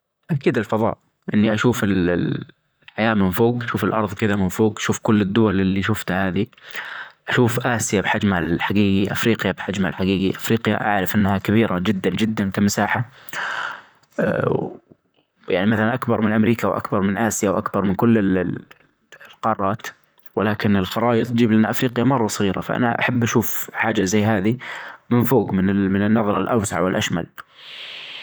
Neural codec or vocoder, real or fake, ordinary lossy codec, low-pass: vocoder, 44.1 kHz, 128 mel bands, Pupu-Vocoder; fake; none; none